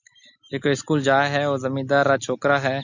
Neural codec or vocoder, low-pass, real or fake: none; 7.2 kHz; real